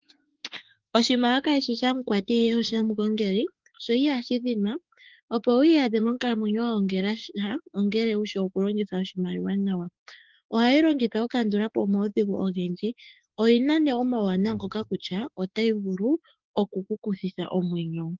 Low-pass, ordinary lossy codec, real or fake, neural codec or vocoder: 7.2 kHz; Opus, 16 kbps; fake; autoencoder, 48 kHz, 32 numbers a frame, DAC-VAE, trained on Japanese speech